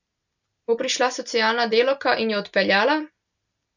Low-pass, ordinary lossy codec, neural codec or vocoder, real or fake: 7.2 kHz; none; none; real